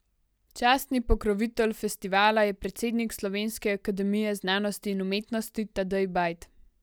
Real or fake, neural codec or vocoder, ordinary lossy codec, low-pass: real; none; none; none